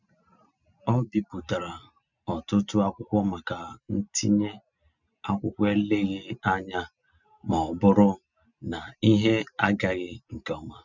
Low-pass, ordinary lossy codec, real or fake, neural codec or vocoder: 7.2 kHz; none; real; none